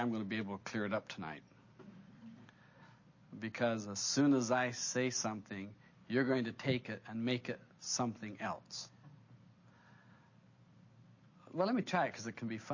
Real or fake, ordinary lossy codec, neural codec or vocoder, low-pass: real; MP3, 32 kbps; none; 7.2 kHz